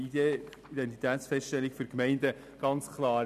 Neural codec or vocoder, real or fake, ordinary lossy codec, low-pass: none; real; none; 14.4 kHz